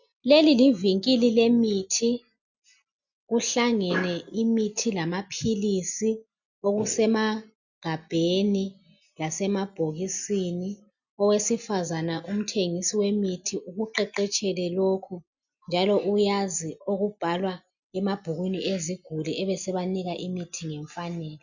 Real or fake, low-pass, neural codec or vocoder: real; 7.2 kHz; none